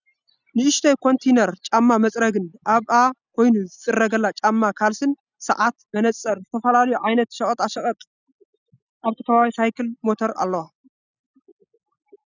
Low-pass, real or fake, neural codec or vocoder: 7.2 kHz; real; none